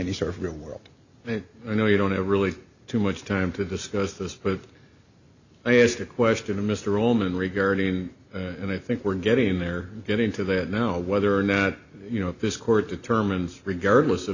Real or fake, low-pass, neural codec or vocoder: real; 7.2 kHz; none